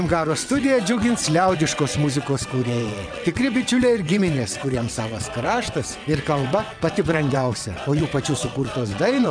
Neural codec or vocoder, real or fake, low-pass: vocoder, 22.05 kHz, 80 mel bands, WaveNeXt; fake; 9.9 kHz